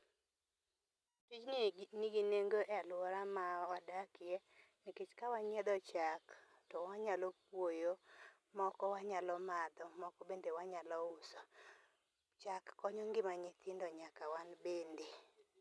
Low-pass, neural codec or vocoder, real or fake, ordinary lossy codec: none; none; real; none